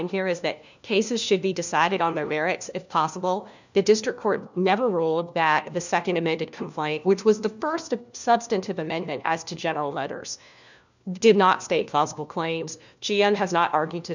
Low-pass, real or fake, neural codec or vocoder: 7.2 kHz; fake; codec, 16 kHz, 1 kbps, FunCodec, trained on LibriTTS, 50 frames a second